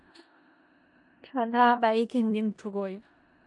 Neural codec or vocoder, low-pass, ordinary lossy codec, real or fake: codec, 16 kHz in and 24 kHz out, 0.4 kbps, LongCat-Audio-Codec, four codebook decoder; 10.8 kHz; AAC, 64 kbps; fake